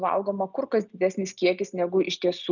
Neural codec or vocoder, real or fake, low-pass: none; real; 7.2 kHz